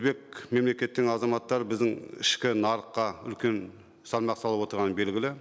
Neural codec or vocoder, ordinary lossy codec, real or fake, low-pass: none; none; real; none